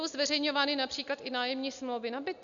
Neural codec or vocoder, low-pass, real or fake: none; 7.2 kHz; real